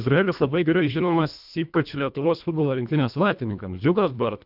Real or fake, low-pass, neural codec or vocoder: fake; 5.4 kHz; codec, 24 kHz, 1.5 kbps, HILCodec